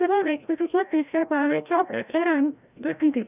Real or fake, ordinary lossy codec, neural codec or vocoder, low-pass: fake; none; codec, 16 kHz, 0.5 kbps, FreqCodec, larger model; 3.6 kHz